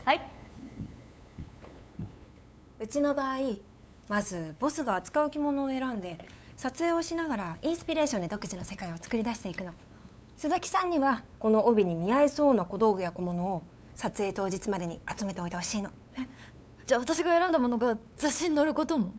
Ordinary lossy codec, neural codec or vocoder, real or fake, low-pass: none; codec, 16 kHz, 8 kbps, FunCodec, trained on LibriTTS, 25 frames a second; fake; none